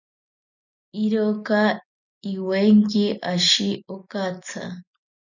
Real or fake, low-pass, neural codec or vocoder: real; 7.2 kHz; none